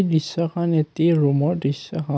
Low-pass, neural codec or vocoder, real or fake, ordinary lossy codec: none; none; real; none